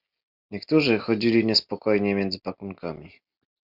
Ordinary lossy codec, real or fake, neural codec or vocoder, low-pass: MP3, 48 kbps; real; none; 5.4 kHz